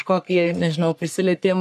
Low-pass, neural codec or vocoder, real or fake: 14.4 kHz; codec, 44.1 kHz, 3.4 kbps, Pupu-Codec; fake